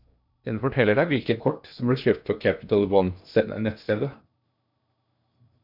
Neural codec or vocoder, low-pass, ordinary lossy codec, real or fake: codec, 16 kHz in and 24 kHz out, 0.8 kbps, FocalCodec, streaming, 65536 codes; 5.4 kHz; MP3, 48 kbps; fake